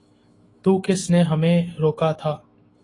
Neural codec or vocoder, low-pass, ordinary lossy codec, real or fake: autoencoder, 48 kHz, 128 numbers a frame, DAC-VAE, trained on Japanese speech; 10.8 kHz; AAC, 32 kbps; fake